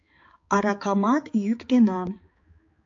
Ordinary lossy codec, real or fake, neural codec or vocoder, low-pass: AAC, 48 kbps; fake; codec, 16 kHz, 4 kbps, X-Codec, HuBERT features, trained on balanced general audio; 7.2 kHz